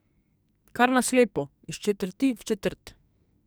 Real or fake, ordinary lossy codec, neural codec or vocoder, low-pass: fake; none; codec, 44.1 kHz, 2.6 kbps, SNAC; none